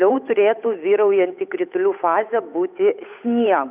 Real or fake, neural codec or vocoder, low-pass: fake; codec, 16 kHz, 8 kbps, FunCodec, trained on Chinese and English, 25 frames a second; 3.6 kHz